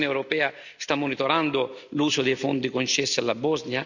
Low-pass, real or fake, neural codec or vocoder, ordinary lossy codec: 7.2 kHz; real; none; none